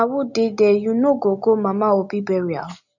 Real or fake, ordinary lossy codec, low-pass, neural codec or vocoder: real; none; 7.2 kHz; none